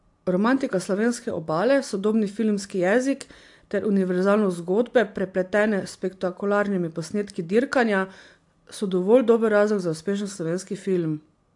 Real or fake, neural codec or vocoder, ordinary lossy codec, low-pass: real; none; AAC, 64 kbps; 10.8 kHz